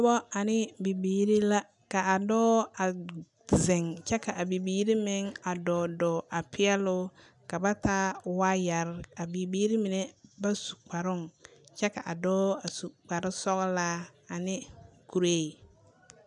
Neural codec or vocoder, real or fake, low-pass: none; real; 10.8 kHz